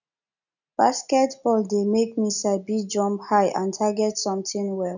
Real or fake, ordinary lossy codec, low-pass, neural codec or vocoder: real; none; 7.2 kHz; none